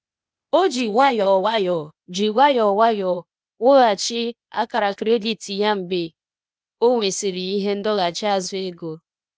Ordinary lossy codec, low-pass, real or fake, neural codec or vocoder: none; none; fake; codec, 16 kHz, 0.8 kbps, ZipCodec